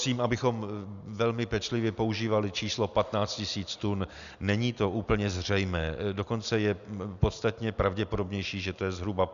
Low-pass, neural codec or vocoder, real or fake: 7.2 kHz; none; real